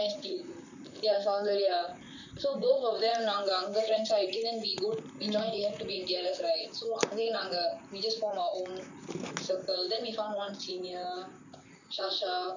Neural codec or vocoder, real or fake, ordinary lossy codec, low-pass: vocoder, 44.1 kHz, 128 mel bands, Pupu-Vocoder; fake; none; 7.2 kHz